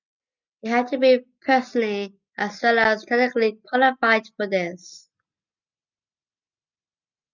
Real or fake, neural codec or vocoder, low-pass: real; none; 7.2 kHz